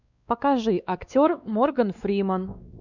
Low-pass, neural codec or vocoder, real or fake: 7.2 kHz; codec, 16 kHz, 2 kbps, X-Codec, WavLM features, trained on Multilingual LibriSpeech; fake